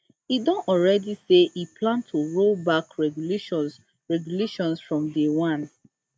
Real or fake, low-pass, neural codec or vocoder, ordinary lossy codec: real; none; none; none